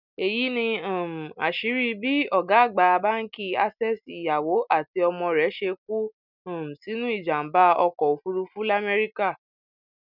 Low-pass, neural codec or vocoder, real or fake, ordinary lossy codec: 5.4 kHz; none; real; none